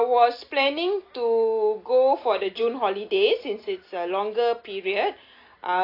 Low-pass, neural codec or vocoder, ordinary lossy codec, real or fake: 5.4 kHz; none; AAC, 32 kbps; real